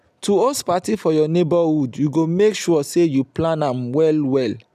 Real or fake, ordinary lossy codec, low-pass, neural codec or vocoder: real; none; 14.4 kHz; none